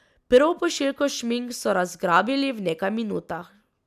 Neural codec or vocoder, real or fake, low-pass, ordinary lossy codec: none; real; 14.4 kHz; none